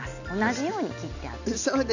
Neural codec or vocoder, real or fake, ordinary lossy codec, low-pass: none; real; none; 7.2 kHz